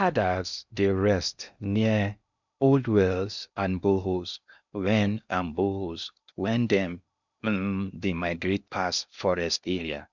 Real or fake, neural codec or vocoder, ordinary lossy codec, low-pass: fake; codec, 16 kHz in and 24 kHz out, 0.8 kbps, FocalCodec, streaming, 65536 codes; none; 7.2 kHz